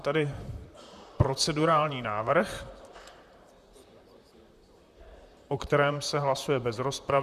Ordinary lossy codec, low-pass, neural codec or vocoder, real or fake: Opus, 64 kbps; 14.4 kHz; vocoder, 44.1 kHz, 128 mel bands, Pupu-Vocoder; fake